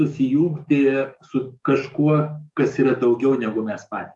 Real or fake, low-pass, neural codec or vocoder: fake; 10.8 kHz; codec, 44.1 kHz, 7.8 kbps, DAC